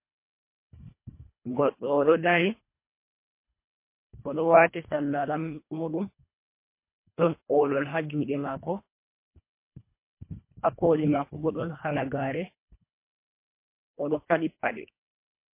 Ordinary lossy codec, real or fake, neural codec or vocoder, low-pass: MP3, 24 kbps; fake; codec, 24 kHz, 1.5 kbps, HILCodec; 3.6 kHz